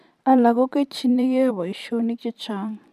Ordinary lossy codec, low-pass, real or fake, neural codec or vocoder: none; 14.4 kHz; fake; vocoder, 44.1 kHz, 128 mel bands every 512 samples, BigVGAN v2